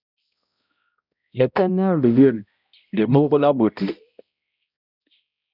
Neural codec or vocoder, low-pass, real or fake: codec, 16 kHz, 0.5 kbps, X-Codec, HuBERT features, trained on balanced general audio; 5.4 kHz; fake